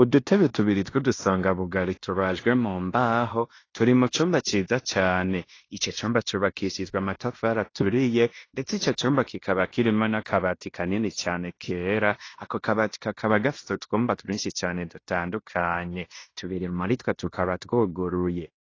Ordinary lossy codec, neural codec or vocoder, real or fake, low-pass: AAC, 32 kbps; codec, 16 kHz in and 24 kHz out, 0.9 kbps, LongCat-Audio-Codec, fine tuned four codebook decoder; fake; 7.2 kHz